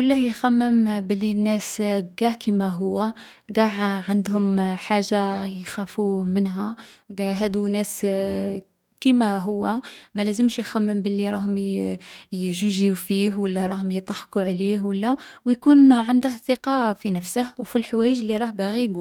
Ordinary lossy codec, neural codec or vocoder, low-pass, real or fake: none; codec, 44.1 kHz, 2.6 kbps, DAC; 19.8 kHz; fake